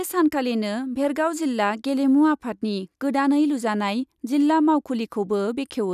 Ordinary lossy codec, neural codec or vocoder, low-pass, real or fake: none; none; 14.4 kHz; real